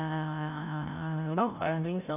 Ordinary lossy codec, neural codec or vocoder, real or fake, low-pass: AAC, 32 kbps; codec, 16 kHz, 0.5 kbps, FreqCodec, larger model; fake; 3.6 kHz